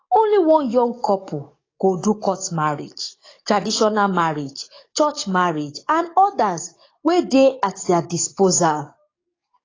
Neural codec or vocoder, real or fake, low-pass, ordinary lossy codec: codec, 44.1 kHz, 7.8 kbps, DAC; fake; 7.2 kHz; AAC, 32 kbps